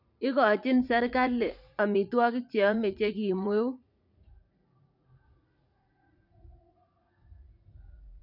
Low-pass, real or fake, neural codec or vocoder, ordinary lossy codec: 5.4 kHz; fake; vocoder, 44.1 kHz, 80 mel bands, Vocos; none